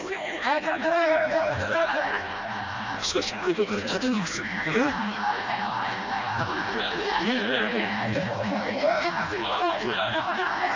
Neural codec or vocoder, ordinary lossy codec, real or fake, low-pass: codec, 16 kHz, 1 kbps, FreqCodec, smaller model; AAC, 48 kbps; fake; 7.2 kHz